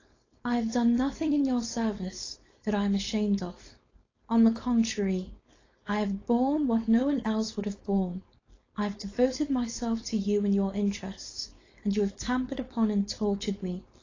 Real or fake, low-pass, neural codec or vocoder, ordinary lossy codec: fake; 7.2 kHz; codec, 16 kHz, 4.8 kbps, FACodec; AAC, 32 kbps